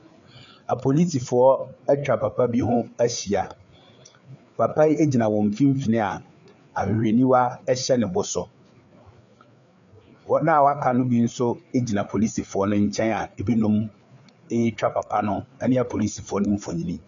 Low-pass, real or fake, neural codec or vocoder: 7.2 kHz; fake; codec, 16 kHz, 4 kbps, FreqCodec, larger model